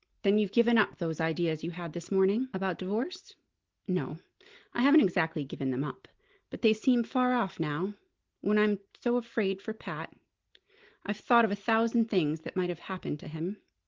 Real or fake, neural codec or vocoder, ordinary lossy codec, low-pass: real; none; Opus, 16 kbps; 7.2 kHz